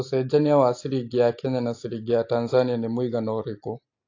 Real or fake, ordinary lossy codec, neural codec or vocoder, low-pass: real; AAC, 48 kbps; none; 7.2 kHz